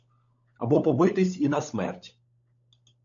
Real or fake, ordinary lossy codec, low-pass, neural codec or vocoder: fake; AAC, 64 kbps; 7.2 kHz; codec, 16 kHz, 16 kbps, FunCodec, trained on LibriTTS, 50 frames a second